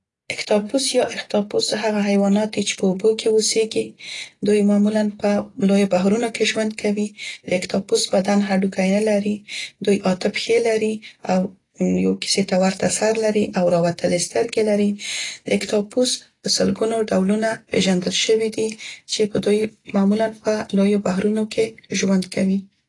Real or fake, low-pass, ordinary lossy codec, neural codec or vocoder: real; 10.8 kHz; AAC, 32 kbps; none